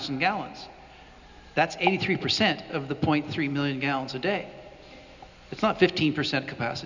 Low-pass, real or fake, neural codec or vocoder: 7.2 kHz; real; none